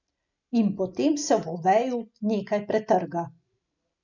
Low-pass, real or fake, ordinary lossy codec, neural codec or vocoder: 7.2 kHz; real; none; none